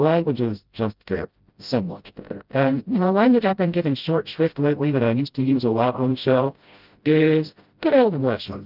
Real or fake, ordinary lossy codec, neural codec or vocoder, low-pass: fake; Opus, 24 kbps; codec, 16 kHz, 0.5 kbps, FreqCodec, smaller model; 5.4 kHz